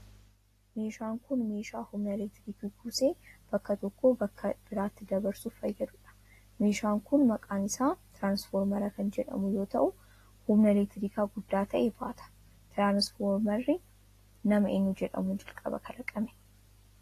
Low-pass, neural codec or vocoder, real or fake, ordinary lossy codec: 14.4 kHz; none; real; AAC, 48 kbps